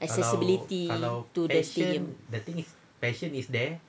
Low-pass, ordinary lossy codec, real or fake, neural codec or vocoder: none; none; real; none